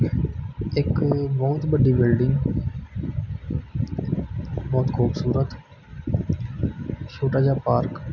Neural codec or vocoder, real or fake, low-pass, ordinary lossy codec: none; real; 7.2 kHz; none